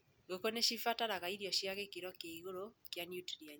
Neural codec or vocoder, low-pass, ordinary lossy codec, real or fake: none; none; none; real